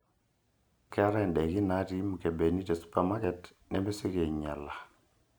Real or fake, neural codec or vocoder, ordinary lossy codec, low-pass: real; none; none; none